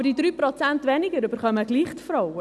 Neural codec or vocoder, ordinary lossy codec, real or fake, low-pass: none; none; real; none